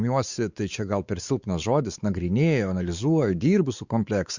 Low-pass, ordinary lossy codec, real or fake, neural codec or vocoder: 7.2 kHz; Opus, 64 kbps; fake; codec, 16 kHz, 4 kbps, X-Codec, WavLM features, trained on Multilingual LibriSpeech